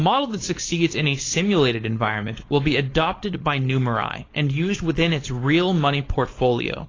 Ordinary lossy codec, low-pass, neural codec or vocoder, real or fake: AAC, 32 kbps; 7.2 kHz; codec, 16 kHz, 4.8 kbps, FACodec; fake